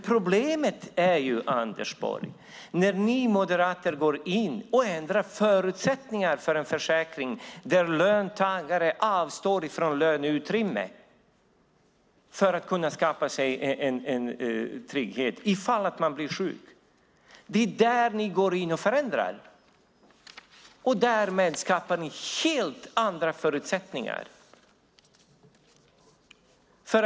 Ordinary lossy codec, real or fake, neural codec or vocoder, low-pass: none; real; none; none